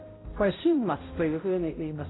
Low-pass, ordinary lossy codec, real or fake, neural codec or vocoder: 7.2 kHz; AAC, 16 kbps; fake; codec, 16 kHz, 0.5 kbps, X-Codec, HuBERT features, trained on balanced general audio